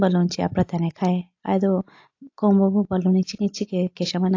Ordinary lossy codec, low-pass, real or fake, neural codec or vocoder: AAC, 48 kbps; 7.2 kHz; real; none